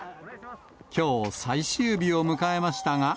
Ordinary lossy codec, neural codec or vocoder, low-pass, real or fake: none; none; none; real